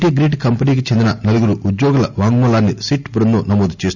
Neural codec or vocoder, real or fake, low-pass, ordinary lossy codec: none; real; 7.2 kHz; none